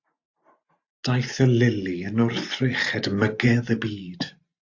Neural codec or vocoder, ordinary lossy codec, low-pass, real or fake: none; AAC, 48 kbps; 7.2 kHz; real